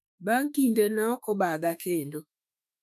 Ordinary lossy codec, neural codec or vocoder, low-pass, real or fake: none; autoencoder, 48 kHz, 32 numbers a frame, DAC-VAE, trained on Japanese speech; 14.4 kHz; fake